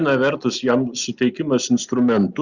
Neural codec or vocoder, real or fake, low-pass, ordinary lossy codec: none; real; 7.2 kHz; Opus, 64 kbps